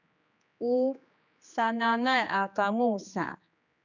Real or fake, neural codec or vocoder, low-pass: fake; codec, 16 kHz, 2 kbps, X-Codec, HuBERT features, trained on general audio; 7.2 kHz